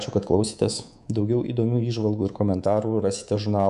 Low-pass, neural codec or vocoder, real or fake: 10.8 kHz; codec, 24 kHz, 3.1 kbps, DualCodec; fake